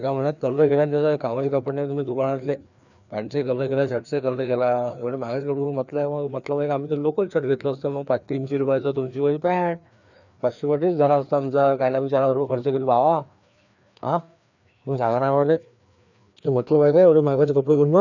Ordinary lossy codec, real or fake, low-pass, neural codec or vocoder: none; fake; 7.2 kHz; codec, 16 kHz, 2 kbps, FreqCodec, larger model